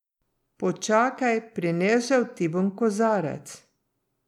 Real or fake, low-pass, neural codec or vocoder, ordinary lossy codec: real; 19.8 kHz; none; none